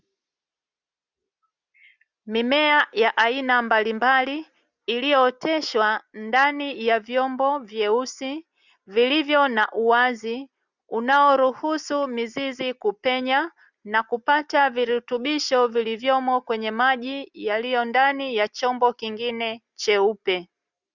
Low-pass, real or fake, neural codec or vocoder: 7.2 kHz; real; none